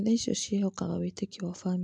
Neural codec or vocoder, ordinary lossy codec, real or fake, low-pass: none; AAC, 64 kbps; real; 9.9 kHz